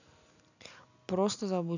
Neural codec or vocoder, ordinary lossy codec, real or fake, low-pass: none; none; real; 7.2 kHz